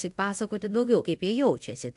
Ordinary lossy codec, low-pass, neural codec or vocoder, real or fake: AAC, 64 kbps; 10.8 kHz; codec, 24 kHz, 0.5 kbps, DualCodec; fake